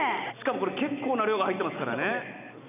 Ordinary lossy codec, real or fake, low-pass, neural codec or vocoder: none; real; 3.6 kHz; none